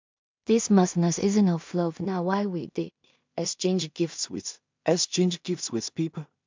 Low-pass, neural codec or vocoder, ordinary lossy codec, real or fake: 7.2 kHz; codec, 16 kHz in and 24 kHz out, 0.4 kbps, LongCat-Audio-Codec, two codebook decoder; MP3, 64 kbps; fake